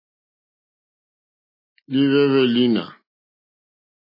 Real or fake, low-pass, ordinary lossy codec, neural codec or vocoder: real; 5.4 kHz; MP3, 24 kbps; none